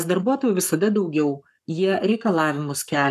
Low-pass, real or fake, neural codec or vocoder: 14.4 kHz; fake; codec, 44.1 kHz, 7.8 kbps, Pupu-Codec